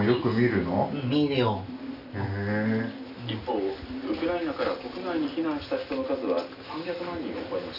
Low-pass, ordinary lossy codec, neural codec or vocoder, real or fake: 5.4 kHz; none; none; real